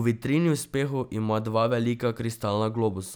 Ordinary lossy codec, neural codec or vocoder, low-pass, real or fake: none; none; none; real